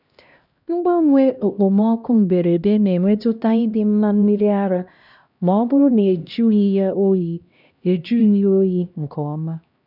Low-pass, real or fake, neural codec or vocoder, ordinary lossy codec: 5.4 kHz; fake; codec, 16 kHz, 1 kbps, X-Codec, HuBERT features, trained on LibriSpeech; none